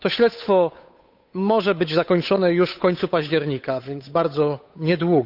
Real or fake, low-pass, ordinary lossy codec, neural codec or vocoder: fake; 5.4 kHz; none; codec, 16 kHz, 8 kbps, FunCodec, trained on Chinese and English, 25 frames a second